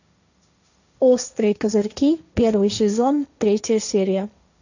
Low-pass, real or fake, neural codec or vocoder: 7.2 kHz; fake; codec, 16 kHz, 1.1 kbps, Voila-Tokenizer